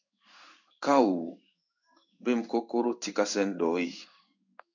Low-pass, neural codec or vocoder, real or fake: 7.2 kHz; codec, 16 kHz in and 24 kHz out, 1 kbps, XY-Tokenizer; fake